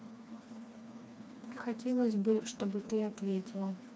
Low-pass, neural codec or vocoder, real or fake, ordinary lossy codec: none; codec, 16 kHz, 2 kbps, FreqCodec, smaller model; fake; none